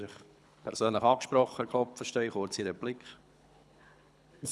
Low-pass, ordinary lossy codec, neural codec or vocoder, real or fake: none; none; codec, 24 kHz, 6 kbps, HILCodec; fake